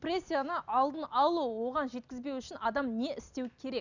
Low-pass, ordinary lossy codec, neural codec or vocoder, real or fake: 7.2 kHz; none; none; real